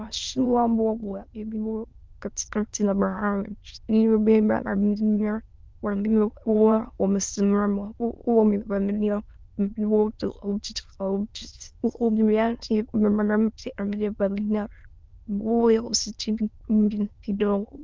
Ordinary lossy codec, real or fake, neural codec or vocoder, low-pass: Opus, 32 kbps; fake; autoencoder, 22.05 kHz, a latent of 192 numbers a frame, VITS, trained on many speakers; 7.2 kHz